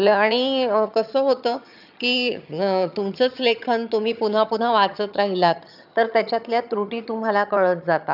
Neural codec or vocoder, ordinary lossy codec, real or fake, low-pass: vocoder, 22.05 kHz, 80 mel bands, HiFi-GAN; none; fake; 5.4 kHz